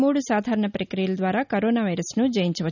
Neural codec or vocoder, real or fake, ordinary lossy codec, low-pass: none; real; none; 7.2 kHz